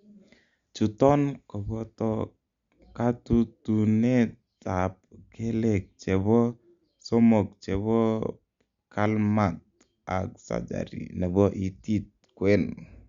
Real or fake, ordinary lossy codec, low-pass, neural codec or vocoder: real; Opus, 64 kbps; 7.2 kHz; none